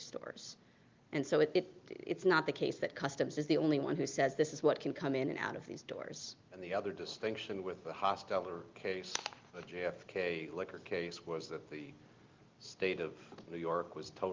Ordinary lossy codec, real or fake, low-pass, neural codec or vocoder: Opus, 32 kbps; real; 7.2 kHz; none